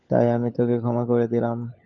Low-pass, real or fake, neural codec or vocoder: 7.2 kHz; fake; codec, 16 kHz, 16 kbps, FunCodec, trained on LibriTTS, 50 frames a second